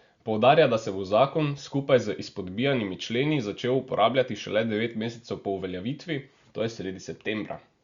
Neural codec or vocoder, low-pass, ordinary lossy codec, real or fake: none; 7.2 kHz; Opus, 64 kbps; real